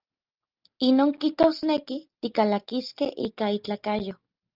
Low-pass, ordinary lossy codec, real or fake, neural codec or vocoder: 5.4 kHz; Opus, 32 kbps; real; none